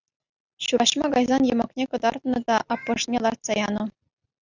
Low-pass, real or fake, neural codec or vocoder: 7.2 kHz; real; none